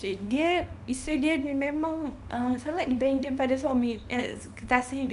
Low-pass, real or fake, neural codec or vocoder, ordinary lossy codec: 10.8 kHz; fake; codec, 24 kHz, 0.9 kbps, WavTokenizer, small release; none